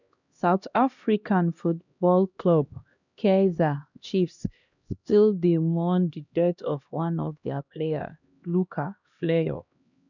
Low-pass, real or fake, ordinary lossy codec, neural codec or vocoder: 7.2 kHz; fake; none; codec, 16 kHz, 1 kbps, X-Codec, HuBERT features, trained on LibriSpeech